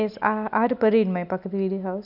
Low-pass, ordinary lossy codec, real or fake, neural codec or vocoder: 5.4 kHz; none; real; none